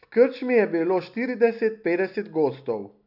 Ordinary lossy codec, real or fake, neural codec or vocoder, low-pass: none; real; none; 5.4 kHz